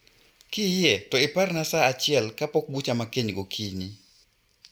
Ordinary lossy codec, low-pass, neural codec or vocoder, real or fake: none; none; none; real